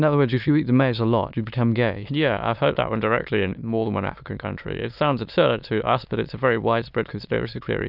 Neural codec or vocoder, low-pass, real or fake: autoencoder, 22.05 kHz, a latent of 192 numbers a frame, VITS, trained on many speakers; 5.4 kHz; fake